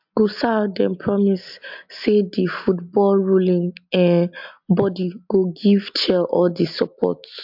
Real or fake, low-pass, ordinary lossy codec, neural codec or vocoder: real; 5.4 kHz; MP3, 48 kbps; none